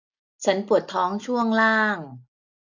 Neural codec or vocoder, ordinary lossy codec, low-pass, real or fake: none; none; 7.2 kHz; real